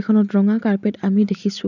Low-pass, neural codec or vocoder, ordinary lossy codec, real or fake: 7.2 kHz; none; none; real